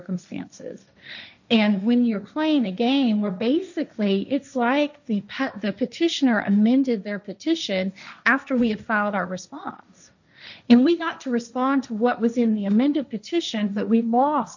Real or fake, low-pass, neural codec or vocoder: fake; 7.2 kHz; codec, 16 kHz, 1.1 kbps, Voila-Tokenizer